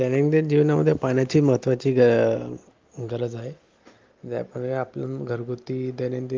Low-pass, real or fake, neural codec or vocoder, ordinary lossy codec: 7.2 kHz; real; none; Opus, 32 kbps